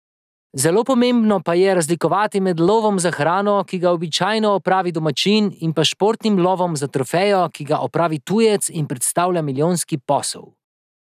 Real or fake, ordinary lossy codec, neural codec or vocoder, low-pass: real; none; none; 14.4 kHz